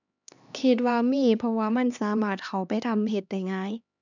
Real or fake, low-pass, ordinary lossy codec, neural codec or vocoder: fake; 7.2 kHz; none; codec, 16 kHz, 2 kbps, X-Codec, HuBERT features, trained on LibriSpeech